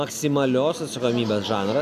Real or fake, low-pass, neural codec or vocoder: real; 14.4 kHz; none